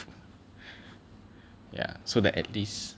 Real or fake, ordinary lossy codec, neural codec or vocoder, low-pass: real; none; none; none